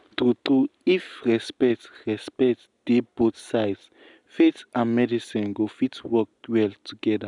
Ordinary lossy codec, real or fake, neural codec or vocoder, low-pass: none; real; none; 10.8 kHz